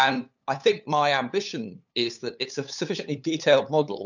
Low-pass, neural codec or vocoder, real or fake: 7.2 kHz; codec, 16 kHz, 8 kbps, FunCodec, trained on LibriTTS, 25 frames a second; fake